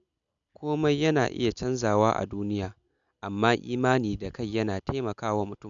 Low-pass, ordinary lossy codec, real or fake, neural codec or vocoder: 7.2 kHz; none; real; none